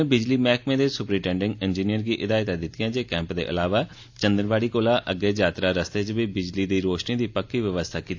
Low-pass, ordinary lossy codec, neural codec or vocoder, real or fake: 7.2 kHz; AAC, 48 kbps; none; real